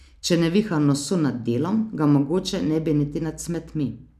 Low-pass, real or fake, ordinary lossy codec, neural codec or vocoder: 14.4 kHz; real; none; none